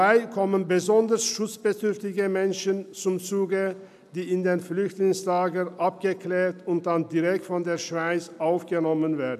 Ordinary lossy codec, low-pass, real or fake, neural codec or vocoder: none; 14.4 kHz; real; none